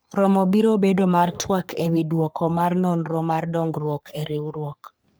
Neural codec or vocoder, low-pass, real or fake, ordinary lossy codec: codec, 44.1 kHz, 3.4 kbps, Pupu-Codec; none; fake; none